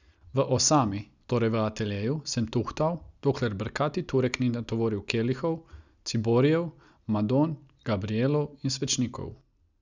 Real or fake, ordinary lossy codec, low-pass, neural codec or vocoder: real; none; 7.2 kHz; none